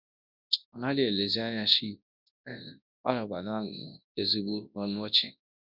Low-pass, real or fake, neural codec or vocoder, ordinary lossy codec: 5.4 kHz; fake; codec, 24 kHz, 0.9 kbps, WavTokenizer, large speech release; none